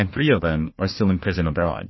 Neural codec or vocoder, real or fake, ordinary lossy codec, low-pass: codec, 16 kHz, 1 kbps, FunCodec, trained on Chinese and English, 50 frames a second; fake; MP3, 24 kbps; 7.2 kHz